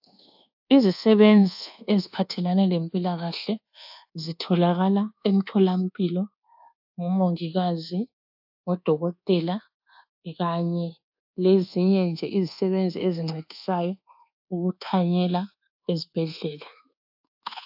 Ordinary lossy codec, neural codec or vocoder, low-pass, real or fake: AAC, 48 kbps; codec, 24 kHz, 1.2 kbps, DualCodec; 5.4 kHz; fake